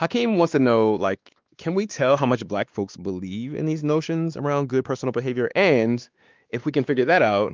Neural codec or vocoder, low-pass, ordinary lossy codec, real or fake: codec, 16 kHz, 2 kbps, X-Codec, WavLM features, trained on Multilingual LibriSpeech; 7.2 kHz; Opus, 24 kbps; fake